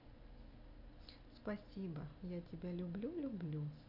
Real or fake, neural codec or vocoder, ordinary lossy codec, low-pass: real; none; none; 5.4 kHz